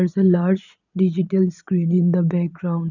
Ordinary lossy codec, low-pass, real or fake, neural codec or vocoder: none; 7.2 kHz; fake; vocoder, 44.1 kHz, 80 mel bands, Vocos